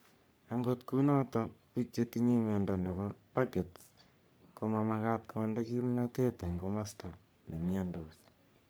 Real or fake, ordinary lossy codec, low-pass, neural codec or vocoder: fake; none; none; codec, 44.1 kHz, 3.4 kbps, Pupu-Codec